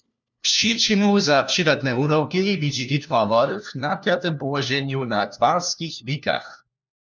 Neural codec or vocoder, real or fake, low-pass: codec, 16 kHz, 1 kbps, FunCodec, trained on LibriTTS, 50 frames a second; fake; 7.2 kHz